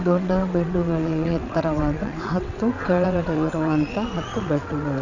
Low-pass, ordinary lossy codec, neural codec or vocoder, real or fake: 7.2 kHz; none; vocoder, 22.05 kHz, 80 mel bands, WaveNeXt; fake